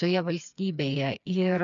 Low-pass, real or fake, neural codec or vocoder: 7.2 kHz; fake; codec, 16 kHz, 4 kbps, FreqCodec, smaller model